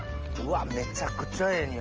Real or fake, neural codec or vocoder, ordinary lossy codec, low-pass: real; none; Opus, 24 kbps; 7.2 kHz